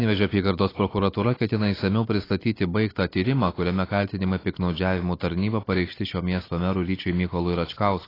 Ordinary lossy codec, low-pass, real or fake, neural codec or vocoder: AAC, 24 kbps; 5.4 kHz; real; none